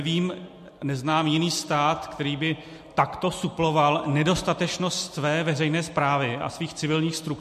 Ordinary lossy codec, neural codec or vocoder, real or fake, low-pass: MP3, 64 kbps; none; real; 14.4 kHz